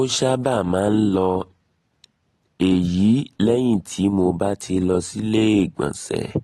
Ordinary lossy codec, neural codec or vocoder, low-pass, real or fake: AAC, 32 kbps; none; 19.8 kHz; real